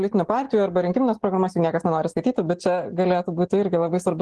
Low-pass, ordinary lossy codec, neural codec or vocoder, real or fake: 10.8 kHz; Opus, 16 kbps; none; real